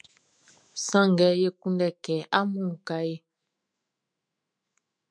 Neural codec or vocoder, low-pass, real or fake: autoencoder, 48 kHz, 128 numbers a frame, DAC-VAE, trained on Japanese speech; 9.9 kHz; fake